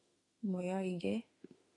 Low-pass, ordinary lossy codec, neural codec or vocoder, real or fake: 10.8 kHz; AAC, 32 kbps; autoencoder, 48 kHz, 32 numbers a frame, DAC-VAE, trained on Japanese speech; fake